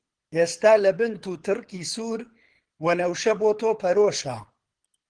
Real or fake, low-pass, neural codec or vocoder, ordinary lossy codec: fake; 9.9 kHz; codec, 24 kHz, 6 kbps, HILCodec; Opus, 16 kbps